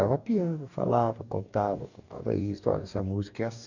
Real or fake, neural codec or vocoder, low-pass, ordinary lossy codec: fake; codec, 44.1 kHz, 2.6 kbps, DAC; 7.2 kHz; none